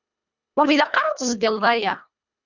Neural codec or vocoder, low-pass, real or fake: codec, 24 kHz, 1.5 kbps, HILCodec; 7.2 kHz; fake